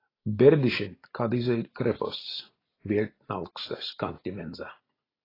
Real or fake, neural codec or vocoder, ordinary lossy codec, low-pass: real; none; AAC, 24 kbps; 5.4 kHz